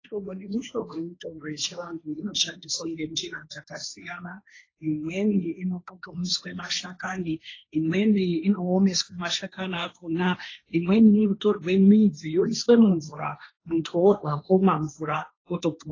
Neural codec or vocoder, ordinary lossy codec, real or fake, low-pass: codec, 16 kHz, 1.1 kbps, Voila-Tokenizer; AAC, 32 kbps; fake; 7.2 kHz